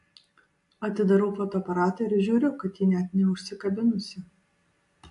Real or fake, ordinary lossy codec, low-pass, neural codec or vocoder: real; AAC, 64 kbps; 10.8 kHz; none